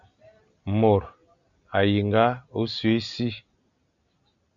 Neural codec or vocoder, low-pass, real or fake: none; 7.2 kHz; real